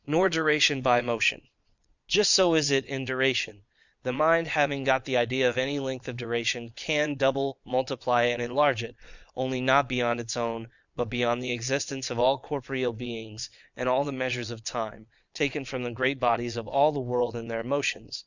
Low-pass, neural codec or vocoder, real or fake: 7.2 kHz; vocoder, 22.05 kHz, 80 mel bands, Vocos; fake